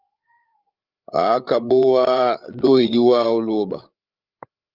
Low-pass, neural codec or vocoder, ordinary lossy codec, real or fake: 5.4 kHz; codec, 16 kHz, 8 kbps, FreqCodec, larger model; Opus, 24 kbps; fake